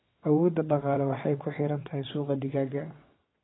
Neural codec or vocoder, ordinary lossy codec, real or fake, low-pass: vocoder, 22.05 kHz, 80 mel bands, WaveNeXt; AAC, 16 kbps; fake; 7.2 kHz